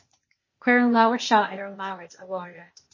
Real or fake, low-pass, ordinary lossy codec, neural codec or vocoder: fake; 7.2 kHz; MP3, 32 kbps; codec, 16 kHz, 0.8 kbps, ZipCodec